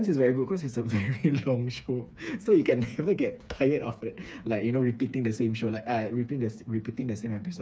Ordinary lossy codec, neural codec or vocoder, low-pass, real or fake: none; codec, 16 kHz, 4 kbps, FreqCodec, smaller model; none; fake